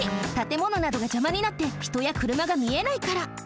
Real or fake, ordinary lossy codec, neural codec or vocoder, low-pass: real; none; none; none